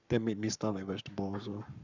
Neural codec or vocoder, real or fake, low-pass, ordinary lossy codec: codec, 44.1 kHz, 7.8 kbps, Pupu-Codec; fake; 7.2 kHz; none